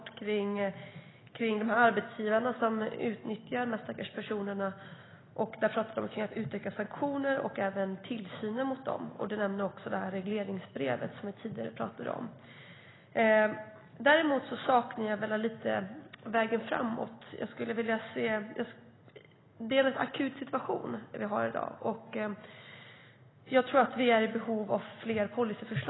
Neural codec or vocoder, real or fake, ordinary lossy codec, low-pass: none; real; AAC, 16 kbps; 7.2 kHz